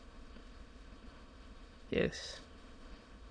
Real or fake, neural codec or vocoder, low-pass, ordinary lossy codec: fake; autoencoder, 22.05 kHz, a latent of 192 numbers a frame, VITS, trained on many speakers; 9.9 kHz; MP3, 48 kbps